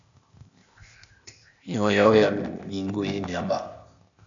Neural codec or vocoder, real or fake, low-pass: codec, 16 kHz, 0.8 kbps, ZipCodec; fake; 7.2 kHz